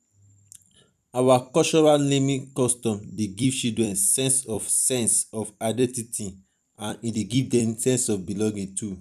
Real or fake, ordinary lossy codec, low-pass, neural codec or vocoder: fake; none; 14.4 kHz; vocoder, 44.1 kHz, 128 mel bands every 256 samples, BigVGAN v2